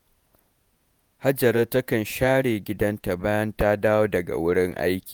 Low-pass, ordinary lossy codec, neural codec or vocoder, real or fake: none; none; vocoder, 48 kHz, 128 mel bands, Vocos; fake